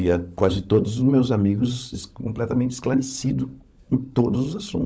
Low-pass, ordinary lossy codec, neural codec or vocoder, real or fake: none; none; codec, 16 kHz, 16 kbps, FunCodec, trained on LibriTTS, 50 frames a second; fake